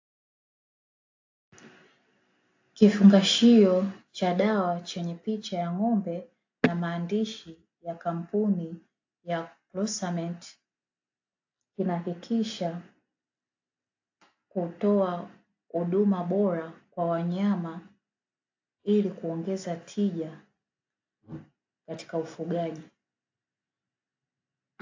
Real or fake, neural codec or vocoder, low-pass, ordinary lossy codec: real; none; 7.2 kHz; AAC, 48 kbps